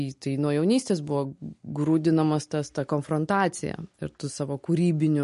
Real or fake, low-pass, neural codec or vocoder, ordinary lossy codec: real; 10.8 kHz; none; MP3, 48 kbps